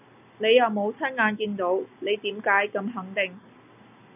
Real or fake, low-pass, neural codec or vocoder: real; 3.6 kHz; none